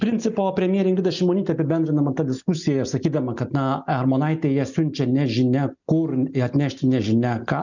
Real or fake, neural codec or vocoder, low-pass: real; none; 7.2 kHz